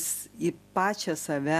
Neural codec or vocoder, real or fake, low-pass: none; real; 14.4 kHz